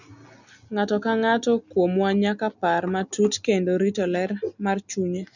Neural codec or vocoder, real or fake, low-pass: none; real; 7.2 kHz